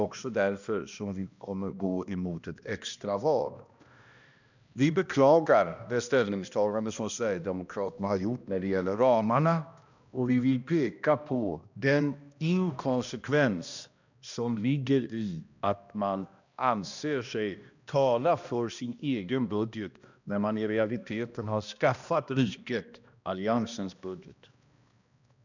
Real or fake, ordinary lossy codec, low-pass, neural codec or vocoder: fake; none; 7.2 kHz; codec, 16 kHz, 1 kbps, X-Codec, HuBERT features, trained on balanced general audio